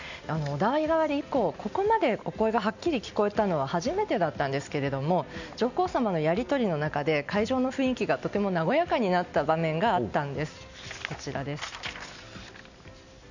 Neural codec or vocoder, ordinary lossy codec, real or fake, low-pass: none; none; real; 7.2 kHz